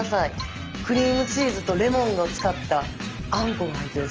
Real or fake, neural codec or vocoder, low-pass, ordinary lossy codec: real; none; 7.2 kHz; Opus, 24 kbps